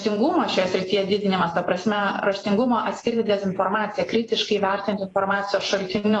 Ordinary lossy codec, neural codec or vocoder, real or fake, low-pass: AAC, 32 kbps; vocoder, 48 kHz, 128 mel bands, Vocos; fake; 10.8 kHz